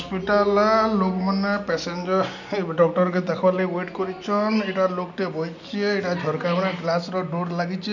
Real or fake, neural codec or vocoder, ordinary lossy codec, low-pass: real; none; none; 7.2 kHz